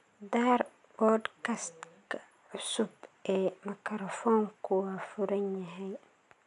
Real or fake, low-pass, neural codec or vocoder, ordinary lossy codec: real; 10.8 kHz; none; none